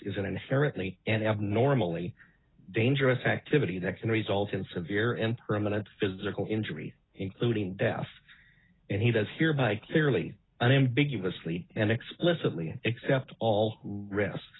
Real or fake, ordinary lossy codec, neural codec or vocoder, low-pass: real; AAC, 16 kbps; none; 7.2 kHz